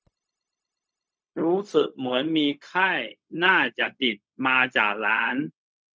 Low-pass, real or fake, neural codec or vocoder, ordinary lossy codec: none; fake; codec, 16 kHz, 0.4 kbps, LongCat-Audio-Codec; none